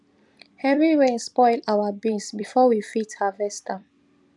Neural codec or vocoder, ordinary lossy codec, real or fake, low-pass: vocoder, 44.1 kHz, 128 mel bands every 256 samples, BigVGAN v2; none; fake; 10.8 kHz